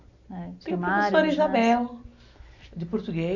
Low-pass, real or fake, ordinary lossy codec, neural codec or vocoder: 7.2 kHz; real; none; none